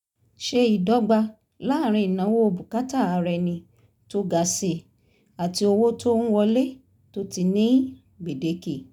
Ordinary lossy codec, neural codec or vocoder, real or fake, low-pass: none; none; real; 19.8 kHz